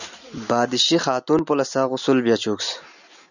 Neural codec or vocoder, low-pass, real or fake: none; 7.2 kHz; real